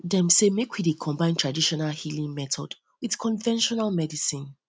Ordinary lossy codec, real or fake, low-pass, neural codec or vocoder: none; real; none; none